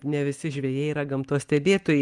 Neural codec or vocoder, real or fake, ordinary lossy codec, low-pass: none; real; Opus, 32 kbps; 10.8 kHz